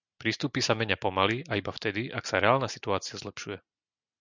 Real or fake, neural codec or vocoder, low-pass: real; none; 7.2 kHz